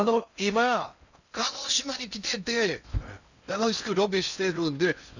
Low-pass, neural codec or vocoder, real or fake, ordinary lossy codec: 7.2 kHz; codec, 16 kHz in and 24 kHz out, 0.6 kbps, FocalCodec, streaming, 2048 codes; fake; none